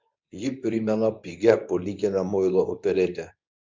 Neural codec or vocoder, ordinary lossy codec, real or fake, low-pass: codec, 24 kHz, 0.9 kbps, WavTokenizer, medium speech release version 2; MP3, 64 kbps; fake; 7.2 kHz